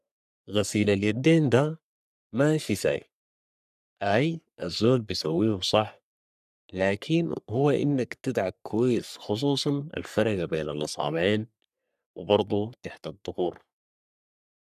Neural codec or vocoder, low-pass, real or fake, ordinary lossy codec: codec, 44.1 kHz, 3.4 kbps, Pupu-Codec; 14.4 kHz; fake; none